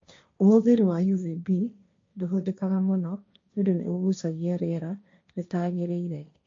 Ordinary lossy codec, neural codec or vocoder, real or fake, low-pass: MP3, 48 kbps; codec, 16 kHz, 1.1 kbps, Voila-Tokenizer; fake; 7.2 kHz